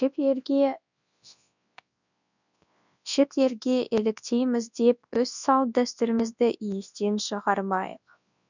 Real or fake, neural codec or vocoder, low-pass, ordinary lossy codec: fake; codec, 24 kHz, 0.9 kbps, WavTokenizer, large speech release; 7.2 kHz; none